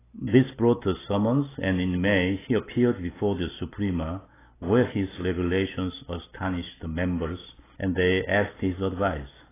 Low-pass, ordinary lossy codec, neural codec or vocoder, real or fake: 3.6 kHz; AAC, 16 kbps; none; real